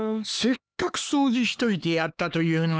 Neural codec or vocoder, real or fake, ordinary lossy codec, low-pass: codec, 16 kHz, 4 kbps, X-Codec, HuBERT features, trained on LibriSpeech; fake; none; none